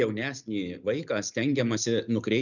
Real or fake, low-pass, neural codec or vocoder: real; 7.2 kHz; none